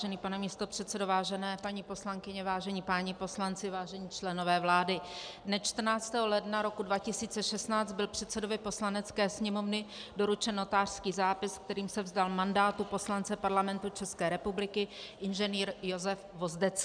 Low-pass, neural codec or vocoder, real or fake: 9.9 kHz; none; real